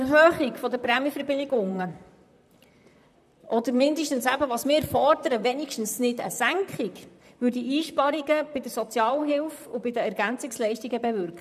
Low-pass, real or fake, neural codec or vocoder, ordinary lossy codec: 14.4 kHz; fake; vocoder, 44.1 kHz, 128 mel bands, Pupu-Vocoder; none